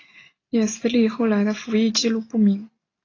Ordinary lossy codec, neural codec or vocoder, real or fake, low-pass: AAC, 32 kbps; none; real; 7.2 kHz